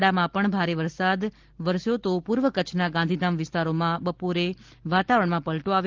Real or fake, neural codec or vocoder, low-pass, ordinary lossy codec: real; none; 7.2 kHz; Opus, 16 kbps